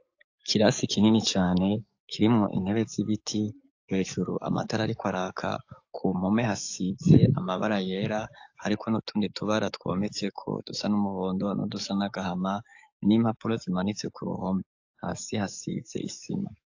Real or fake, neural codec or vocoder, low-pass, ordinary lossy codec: fake; codec, 44.1 kHz, 7.8 kbps, DAC; 7.2 kHz; AAC, 48 kbps